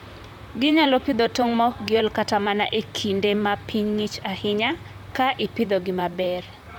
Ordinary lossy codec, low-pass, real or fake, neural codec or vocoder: MP3, 96 kbps; 19.8 kHz; fake; vocoder, 44.1 kHz, 128 mel bands, Pupu-Vocoder